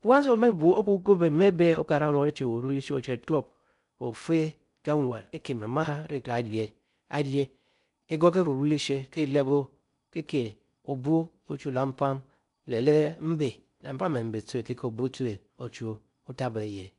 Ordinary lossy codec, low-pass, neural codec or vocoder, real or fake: none; 10.8 kHz; codec, 16 kHz in and 24 kHz out, 0.6 kbps, FocalCodec, streaming, 2048 codes; fake